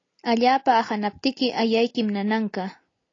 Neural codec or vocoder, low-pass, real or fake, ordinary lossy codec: none; 7.2 kHz; real; AAC, 32 kbps